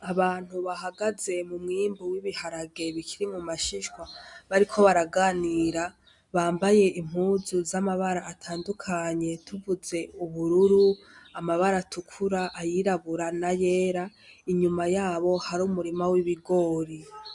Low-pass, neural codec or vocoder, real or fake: 10.8 kHz; none; real